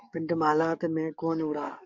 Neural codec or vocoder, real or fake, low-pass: codec, 44.1 kHz, 7.8 kbps, DAC; fake; 7.2 kHz